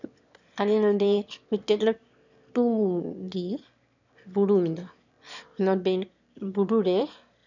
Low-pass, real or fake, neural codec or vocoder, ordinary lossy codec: 7.2 kHz; fake; autoencoder, 22.05 kHz, a latent of 192 numbers a frame, VITS, trained on one speaker; none